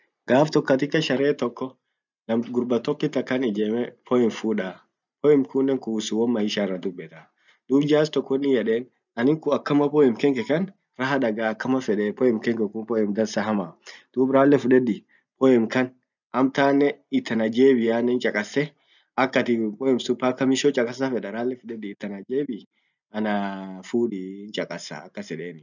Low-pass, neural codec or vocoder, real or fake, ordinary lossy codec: 7.2 kHz; none; real; none